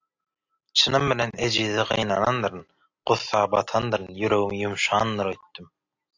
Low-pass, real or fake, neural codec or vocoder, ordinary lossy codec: 7.2 kHz; real; none; AAC, 48 kbps